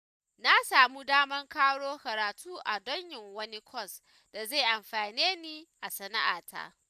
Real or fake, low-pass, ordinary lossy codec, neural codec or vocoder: real; 14.4 kHz; none; none